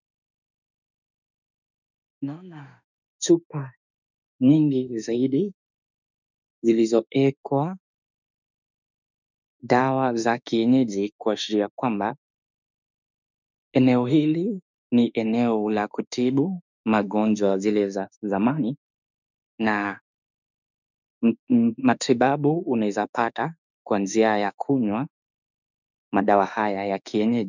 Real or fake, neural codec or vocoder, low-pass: fake; autoencoder, 48 kHz, 32 numbers a frame, DAC-VAE, trained on Japanese speech; 7.2 kHz